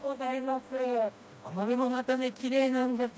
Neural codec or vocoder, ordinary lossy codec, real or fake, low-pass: codec, 16 kHz, 1 kbps, FreqCodec, smaller model; none; fake; none